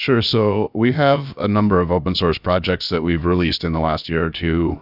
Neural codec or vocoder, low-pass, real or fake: codec, 16 kHz, 0.7 kbps, FocalCodec; 5.4 kHz; fake